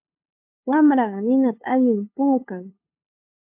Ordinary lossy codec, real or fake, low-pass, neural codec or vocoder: MP3, 32 kbps; fake; 3.6 kHz; codec, 16 kHz, 2 kbps, FunCodec, trained on LibriTTS, 25 frames a second